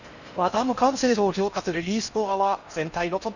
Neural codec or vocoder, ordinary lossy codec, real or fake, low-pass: codec, 16 kHz in and 24 kHz out, 0.6 kbps, FocalCodec, streaming, 4096 codes; none; fake; 7.2 kHz